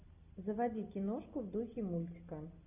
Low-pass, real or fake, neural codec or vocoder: 3.6 kHz; real; none